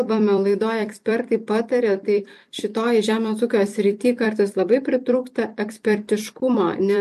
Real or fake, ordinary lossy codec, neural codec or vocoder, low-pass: fake; MP3, 64 kbps; vocoder, 44.1 kHz, 128 mel bands every 256 samples, BigVGAN v2; 14.4 kHz